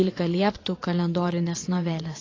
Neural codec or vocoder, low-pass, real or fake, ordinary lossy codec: vocoder, 22.05 kHz, 80 mel bands, WaveNeXt; 7.2 kHz; fake; AAC, 32 kbps